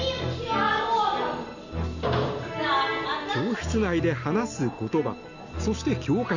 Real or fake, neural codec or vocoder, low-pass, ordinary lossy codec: real; none; 7.2 kHz; none